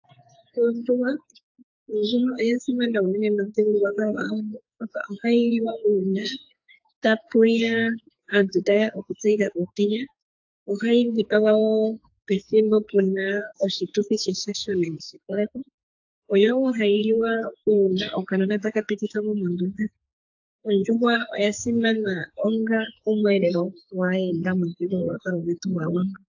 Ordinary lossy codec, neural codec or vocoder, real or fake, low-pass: AAC, 48 kbps; codec, 44.1 kHz, 2.6 kbps, SNAC; fake; 7.2 kHz